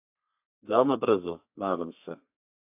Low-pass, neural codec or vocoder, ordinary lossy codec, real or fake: 3.6 kHz; codec, 32 kHz, 1.9 kbps, SNAC; AAC, 32 kbps; fake